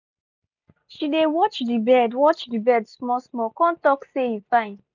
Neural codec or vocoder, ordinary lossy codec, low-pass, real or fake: none; none; 7.2 kHz; real